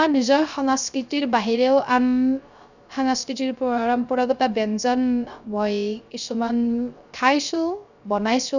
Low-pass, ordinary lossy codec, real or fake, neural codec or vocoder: 7.2 kHz; none; fake; codec, 16 kHz, 0.3 kbps, FocalCodec